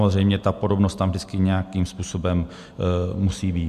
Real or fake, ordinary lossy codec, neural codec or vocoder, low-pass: real; AAC, 96 kbps; none; 14.4 kHz